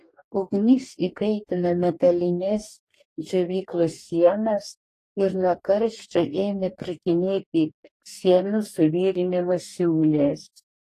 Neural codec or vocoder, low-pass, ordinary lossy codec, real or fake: codec, 44.1 kHz, 2.6 kbps, DAC; 14.4 kHz; AAC, 48 kbps; fake